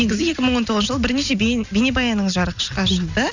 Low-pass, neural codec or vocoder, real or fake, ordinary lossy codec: 7.2 kHz; none; real; none